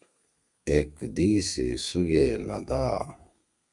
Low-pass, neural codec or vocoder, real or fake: 10.8 kHz; codec, 32 kHz, 1.9 kbps, SNAC; fake